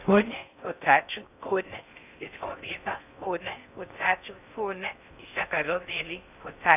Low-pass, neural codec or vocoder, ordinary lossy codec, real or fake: 3.6 kHz; codec, 16 kHz in and 24 kHz out, 0.6 kbps, FocalCodec, streaming, 4096 codes; none; fake